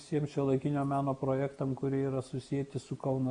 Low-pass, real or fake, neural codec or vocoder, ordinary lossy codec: 9.9 kHz; real; none; Opus, 64 kbps